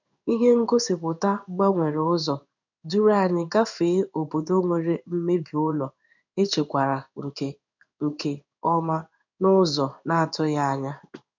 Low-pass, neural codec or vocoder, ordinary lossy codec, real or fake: 7.2 kHz; codec, 16 kHz in and 24 kHz out, 1 kbps, XY-Tokenizer; none; fake